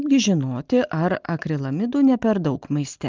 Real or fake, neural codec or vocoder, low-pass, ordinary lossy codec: real; none; 7.2 kHz; Opus, 32 kbps